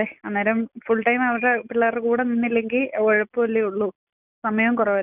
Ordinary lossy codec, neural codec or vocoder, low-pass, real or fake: none; none; 3.6 kHz; real